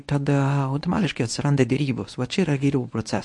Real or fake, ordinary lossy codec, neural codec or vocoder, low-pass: fake; MP3, 48 kbps; codec, 24 kHz, 0.9 kbps, WavTokenizer, medium speech release version 1; 10.8 kHz